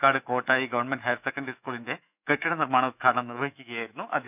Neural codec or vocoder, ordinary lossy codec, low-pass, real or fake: autoencoder, 48 kHz, 128 numbers a frame, DAC-VAE, trained on Japanese speech; none; 3.6 kHz; fake